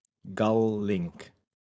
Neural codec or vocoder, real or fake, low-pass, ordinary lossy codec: codec, 16 kHz, 4.8 kbps, FACodec; fake; none; none